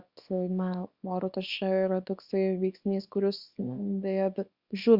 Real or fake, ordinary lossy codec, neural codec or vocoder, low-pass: fake; Opus, 64 kbps; codec, 24 kHz, 0.9 kbps, WavTokenizer, small release; 5.4 kHz